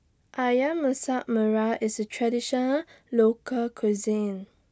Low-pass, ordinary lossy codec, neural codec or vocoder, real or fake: none; none; none; real